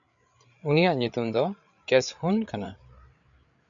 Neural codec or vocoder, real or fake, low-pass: codec, 16 kHz, 8 kbps, FreqCodec, larger model; fake; 7.2 kHz